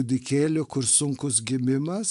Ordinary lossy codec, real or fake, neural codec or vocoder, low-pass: AAC, 96 kbps; real; none; 10.8 kHz